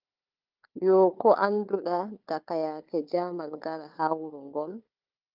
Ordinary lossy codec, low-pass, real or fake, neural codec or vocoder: Opus, 24 kbps; 5.4 kHz; fake; codec, 16 kHz, 4 kbps, FunCodec, trained on Chinese and English, 50 frames a second